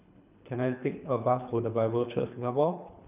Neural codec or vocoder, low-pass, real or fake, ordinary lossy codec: codec, 24 kHz, 3 kbps, HILCodec; 3.6 kHz; fake; none